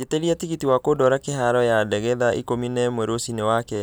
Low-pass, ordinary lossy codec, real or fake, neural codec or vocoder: none; none; real; none